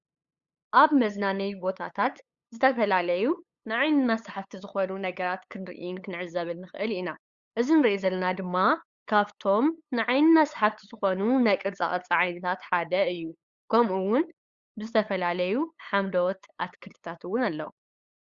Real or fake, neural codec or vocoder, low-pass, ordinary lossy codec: fake; codec, 16 kHz, 8 kbps, FunCodec, trained on LibriTTS, 25 frames a second; 7.2 kHz; Opus, 64 kbps